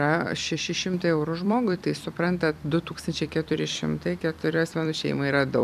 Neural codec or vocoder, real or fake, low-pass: vocoder, 44.1 kHz, 128 mel bands every 256 samples, BigVGAN v2; fake; 14.4 kHz